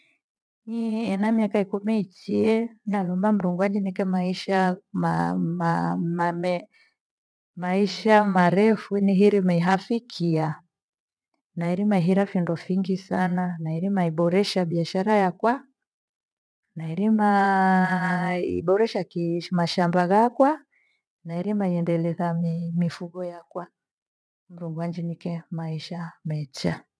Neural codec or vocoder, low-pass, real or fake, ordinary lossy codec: vocoder, 22.05 kHz, 80 mel bands, Vocos; none; fake; none